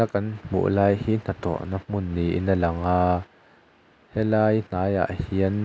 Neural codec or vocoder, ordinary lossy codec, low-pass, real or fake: none; none; none; real